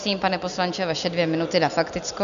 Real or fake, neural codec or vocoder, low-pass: real; none; 7.2 kHz